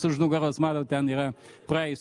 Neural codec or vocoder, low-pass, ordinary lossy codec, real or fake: none; 10.8 kHz; Opus, 32 kbps; real